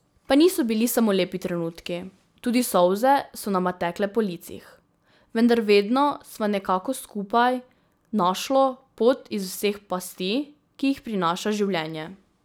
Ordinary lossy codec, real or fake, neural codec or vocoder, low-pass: none; real; none; none